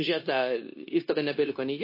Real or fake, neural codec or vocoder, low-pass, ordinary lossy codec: fake; codec, 16 kHz, 0.9 kbps, LongCat-Audio-Codec; 5.4 kHz; MP3, 24 kbps